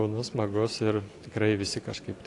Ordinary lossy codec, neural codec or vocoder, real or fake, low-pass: AAC, 48 kbps; none; real; 10.8 kHz